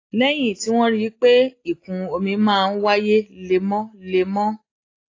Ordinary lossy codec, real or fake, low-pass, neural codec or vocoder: AAC, 32 kbps; real; 7.2 kHz; none